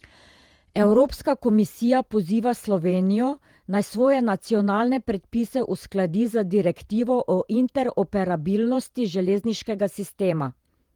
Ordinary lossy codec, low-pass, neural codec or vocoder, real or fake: Opus, 24 kbps; 19.8 kHz; vocoder, 48 kHz, 128 mel bands, Vocos; fake